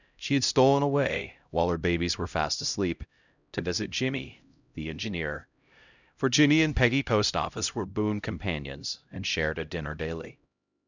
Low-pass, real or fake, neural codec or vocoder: 7.2 kHz; fake; codec, 16 kHz, 0.5 kbps, X-Codec, HuBERT features, trained on LibriSpeech